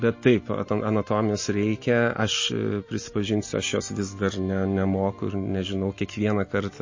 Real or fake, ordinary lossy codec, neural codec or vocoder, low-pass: real; MP3, 32 kbps; none; 7.2 kHz